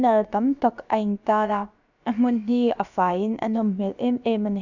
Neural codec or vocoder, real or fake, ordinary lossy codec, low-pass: codec, 16 kHz, about 1 kbps, DyCAST, with the encoder's durations; fake; none; 7.2 kHz